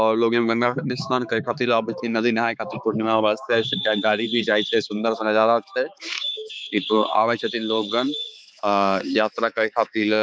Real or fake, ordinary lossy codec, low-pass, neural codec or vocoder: fake; none; none; codec, 16 kHz, 4 kbps, X-Codec, HuBERT features, trained on balanced general audio